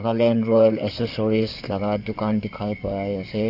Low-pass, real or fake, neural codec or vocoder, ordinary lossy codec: 5.4 kHz; fake; codec, 16 kHz in and 24 kHz out, 2.2 kbps, FireRedTTS-2 codec; none